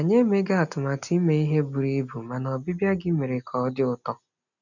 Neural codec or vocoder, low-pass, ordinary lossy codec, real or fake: none; 7.2 kHz; none; real